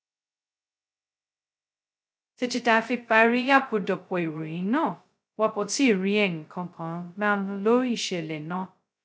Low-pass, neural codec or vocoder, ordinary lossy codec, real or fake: none; codec, 16 kHz, 0.2 kbps, FocalCodec; none; fake